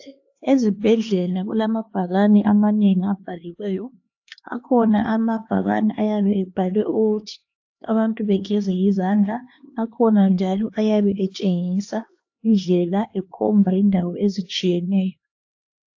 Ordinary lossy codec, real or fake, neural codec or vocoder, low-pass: AAC, 48 kbps; fake; codec, 16 kHz, 2 kbps, X-Codec, HuBERT features, trained on LibriSpeech; 7.2 kHz